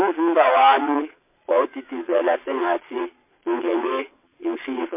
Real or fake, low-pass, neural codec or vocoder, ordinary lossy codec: fake; 3.6 kHz; vocoder, 22.05 kHz, 80 mel bands, Vocos; MP3, 24 kbps